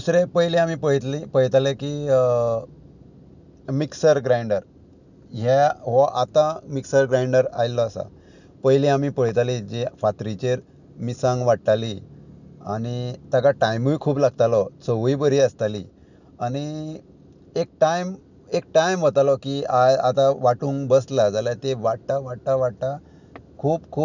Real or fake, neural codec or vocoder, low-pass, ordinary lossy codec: real; none; 7.2 kHz; none